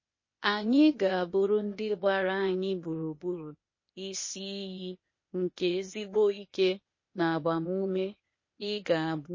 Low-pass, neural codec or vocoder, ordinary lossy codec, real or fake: 7.2 kHz; codec, 16 kHz, 0.8 kbps, ZipCodec; MP3, 32 kbps; fake